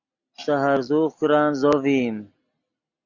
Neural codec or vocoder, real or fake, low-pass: none; real; 7.2 kHz